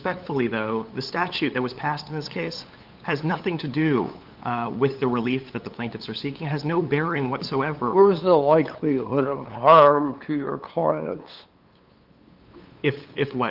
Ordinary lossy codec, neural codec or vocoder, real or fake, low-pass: Opus, 32 kbps; codec, 16 kHz, 8 kbps, FunCodec, trained on LibriTTS, 25 frames a second; fake; 5.4 kHz